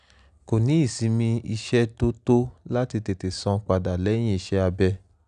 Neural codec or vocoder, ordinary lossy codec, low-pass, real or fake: none; none; 9.9 kHz; real